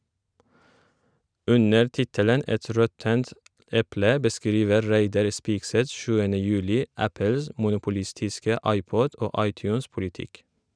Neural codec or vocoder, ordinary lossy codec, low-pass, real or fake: none; none; 9.9 kHz; real